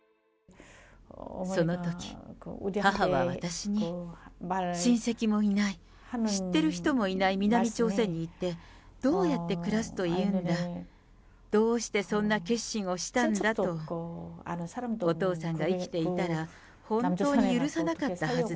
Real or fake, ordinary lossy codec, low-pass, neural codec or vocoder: real; none; none; none